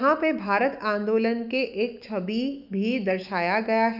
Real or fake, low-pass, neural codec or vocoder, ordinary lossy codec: fake; 5.4 kHz; autoencoder, 48 kHz, 128 numbers a frame, DAC-VAE, trained on Japanese speech; none